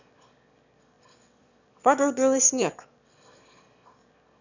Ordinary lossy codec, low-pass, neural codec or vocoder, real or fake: none; 7.2 kHz; autoencoder, 22.05 kHz, a latent of 192 numbers a frame, VITS, trained on one speaker; fake